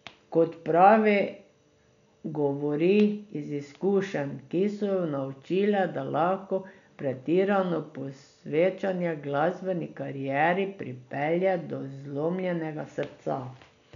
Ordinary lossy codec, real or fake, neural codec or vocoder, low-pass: none; real; none; 7.2 kHz